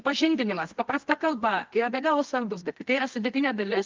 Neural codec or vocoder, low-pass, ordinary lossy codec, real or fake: codec, 24 kHz, 0.9 kbps, WavTokenizer, medium music audio release; 7.2 kHz; Opus, 32 kbps; fake